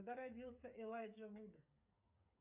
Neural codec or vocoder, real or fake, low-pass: codec, 16 kHz, 8 kbps, FreqCodec, smaller model; fake; 3.6 kHz